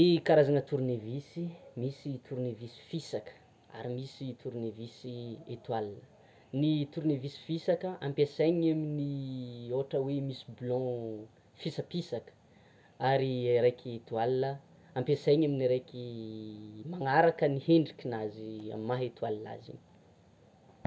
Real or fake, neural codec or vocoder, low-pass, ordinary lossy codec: real; none; none; none